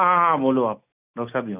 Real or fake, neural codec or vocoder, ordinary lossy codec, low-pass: real; none; none; 3.6 kHz